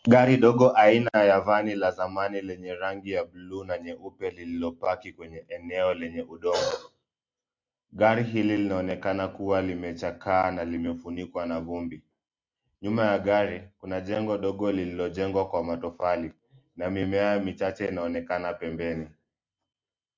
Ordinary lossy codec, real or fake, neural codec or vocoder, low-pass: MP3, 64 kbps; real; none; 7.2 kHz